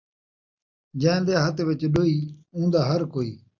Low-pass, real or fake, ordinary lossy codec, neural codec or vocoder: 7.2 kHz; real; MP3, 64 kbps; none